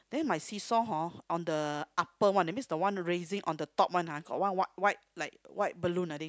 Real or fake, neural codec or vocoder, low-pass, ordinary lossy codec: real; none; none; none